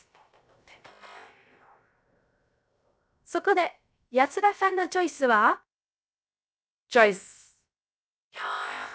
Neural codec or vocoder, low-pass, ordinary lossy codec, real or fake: codec, 16 kHz, 0.3 kbps, FocalCodec; none; none; fake